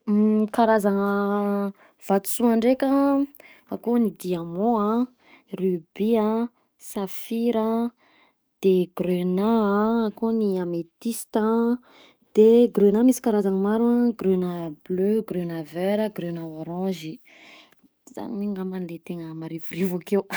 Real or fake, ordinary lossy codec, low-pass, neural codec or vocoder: fake; none; none; codec, 44.1 kHz, 7.8 kbps, DAC